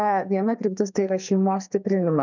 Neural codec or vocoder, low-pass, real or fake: codec, 44.1 kHz, 2.6 kbps, SNAC; 7.2 kHz; fake